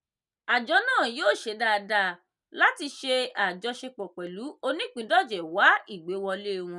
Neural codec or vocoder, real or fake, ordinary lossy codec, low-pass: none; real; none; none